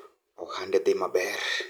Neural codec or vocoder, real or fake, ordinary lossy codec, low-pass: none; real; none; none